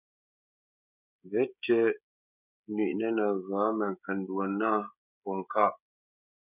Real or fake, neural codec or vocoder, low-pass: fake; codec, 16 kHz, 16 kbps, FreqCodec, smaller model; 3.6 kHz